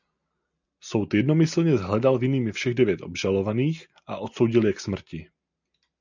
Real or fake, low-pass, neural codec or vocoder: real; 7.2 kHz; none